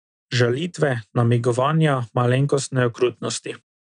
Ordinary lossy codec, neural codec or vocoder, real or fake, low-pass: none; none; real; 9.9 kHz